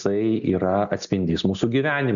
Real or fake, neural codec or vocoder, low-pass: real; none; 7.2 kHz